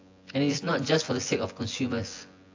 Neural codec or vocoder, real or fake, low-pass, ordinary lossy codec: vocoder, 24 kHz, 100 mel bands, Vocos; fake; 7.2 kHz; AAC, 48 kbps